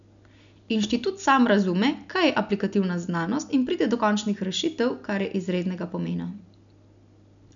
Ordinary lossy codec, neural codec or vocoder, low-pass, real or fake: none; none; 7.2 kHz; real